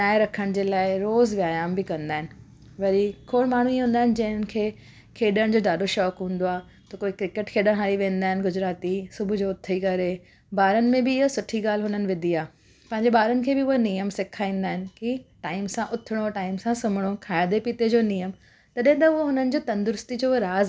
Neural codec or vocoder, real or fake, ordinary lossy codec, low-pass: none; real; none; none